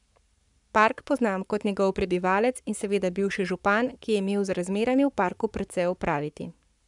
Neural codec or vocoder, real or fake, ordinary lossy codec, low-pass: codec, 44.1 kHz, 7.8 kbps, Pupu-Codec; fake; none; 10.8 kHz